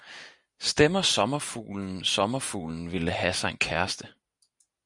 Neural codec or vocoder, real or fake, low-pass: none; real; 10.8 kHz